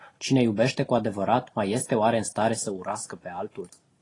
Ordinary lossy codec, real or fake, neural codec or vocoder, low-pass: AAC, 32 kbps; real; none; 10.8 kHz